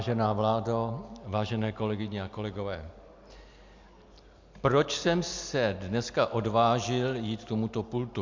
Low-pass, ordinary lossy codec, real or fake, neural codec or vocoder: 7.2 kHz; MP3, 64 kbps; real; none